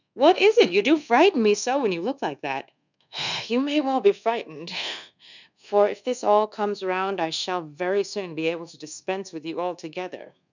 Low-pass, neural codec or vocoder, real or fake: 7.2 kHz; codec, 24 kHz, 1.2 kbps, DualCodec; fake